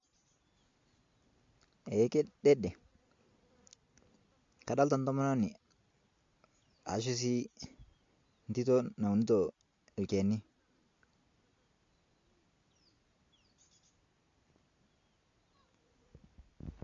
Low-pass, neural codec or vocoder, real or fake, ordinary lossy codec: 7.2 kHz; none; real; MP3, 48 kbps